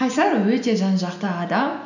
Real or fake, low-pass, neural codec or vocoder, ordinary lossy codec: real; 7.2 kHz; none; none